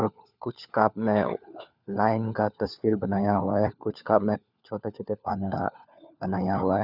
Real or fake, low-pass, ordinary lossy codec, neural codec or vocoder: fake; 5.4 kHz; none; codec, 16 kHz, 4 kbps, FunCodec, trained on LibriTTS, 50 frames a second